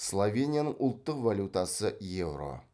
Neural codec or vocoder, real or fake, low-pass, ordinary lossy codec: none; real; none; none